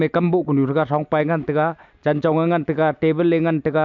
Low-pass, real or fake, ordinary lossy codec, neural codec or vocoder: 7.2 kHz; real; MP3, 64 kbps; none